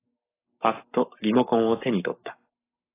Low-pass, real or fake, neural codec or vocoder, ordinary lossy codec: 3.6 kHz; fake; codec, 44.1 kHz, 7.8 kbps, Pupu-Codec; AAC, 24 kbps